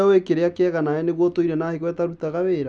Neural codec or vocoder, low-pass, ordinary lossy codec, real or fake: none; 9.9 kHz; none; real